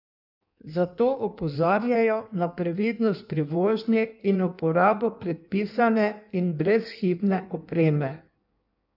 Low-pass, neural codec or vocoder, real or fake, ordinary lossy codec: 5.4 kHz; codec, 16 kHz in and 24 kHz out, 1.1 kbps, FireRedTTS-2 codec; fake; none